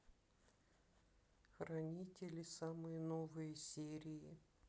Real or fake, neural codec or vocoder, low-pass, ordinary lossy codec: real; none; none; none